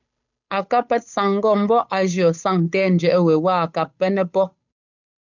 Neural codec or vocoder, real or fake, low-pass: codec, 16 kHz, 8 kbps, FunCodec, trained on Chinese and English, 25 frames a second; fake; 7.2 kHz